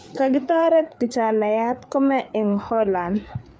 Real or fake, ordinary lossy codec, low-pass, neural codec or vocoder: fake; none; none; codec, 16 kHz, 4 kbps, FreqCodec, larger model